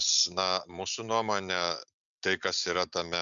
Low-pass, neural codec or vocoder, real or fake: 7.2 kHz; none; real